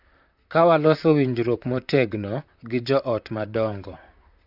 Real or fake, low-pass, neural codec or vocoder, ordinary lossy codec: fake; 5.4 kHz; vocoder, 44.1 kHz, 128 mel bands, Pupu-Vocoder; none